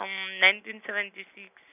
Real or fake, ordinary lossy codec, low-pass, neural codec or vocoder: real; none; 3.6 kHz; none